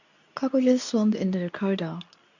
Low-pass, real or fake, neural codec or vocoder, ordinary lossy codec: 7.2 kHz; fake; codec, 24 kHz, 0.9 kbps, WavTokenizer, medium speech release version 2; none